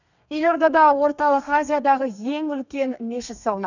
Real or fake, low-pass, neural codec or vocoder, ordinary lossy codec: fake; 7.2 kHz; codec, 32 kHz, 1.9 kbps, SNAC; none